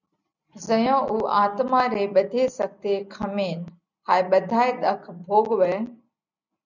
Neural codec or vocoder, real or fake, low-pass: none; real; 7.2 kHz